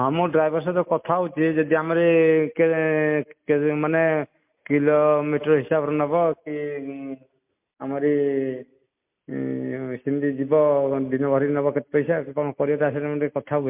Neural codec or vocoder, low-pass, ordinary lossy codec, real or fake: none; 3.6 kHz; MP3, 32 kbps; real